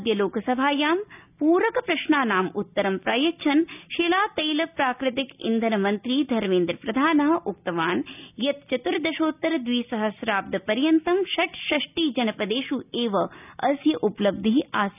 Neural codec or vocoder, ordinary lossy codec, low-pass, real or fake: none; none; 3.6 kHz; real